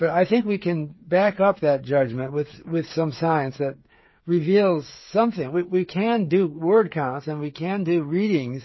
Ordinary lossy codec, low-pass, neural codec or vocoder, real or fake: MP3, 24 kbps; 7.2 kHz; codec, 16 kHz, 8 kbps, FreqCodec, smaller model; fake